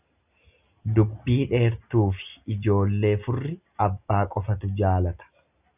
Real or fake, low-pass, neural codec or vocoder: real; 3.6 kHz; none